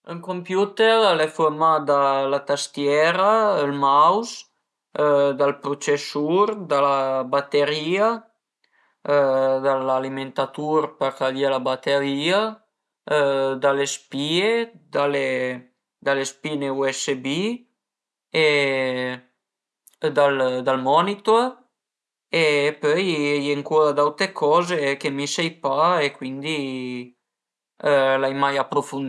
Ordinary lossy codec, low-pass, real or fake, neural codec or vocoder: none; none; real; none